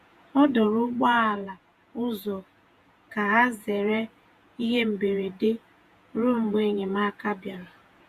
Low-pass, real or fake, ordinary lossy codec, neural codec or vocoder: 14.4 kHz; fake; Opus, 64 kbps; vocoder, 48 kHz, 128 mel bands, Vocos